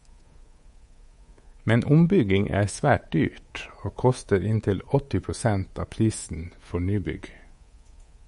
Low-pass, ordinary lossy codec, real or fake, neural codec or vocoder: 10.8 kHz; MP3, 48 kbps; fake; codec, 24 kHz, 3.1 kbps, DualCodec